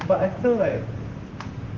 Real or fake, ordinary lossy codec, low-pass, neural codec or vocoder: fake; Opus, 16 kbps; 7.2 kHz; vocoder, 44.1 kHz, 128 mel bands, Pupu-Vocoder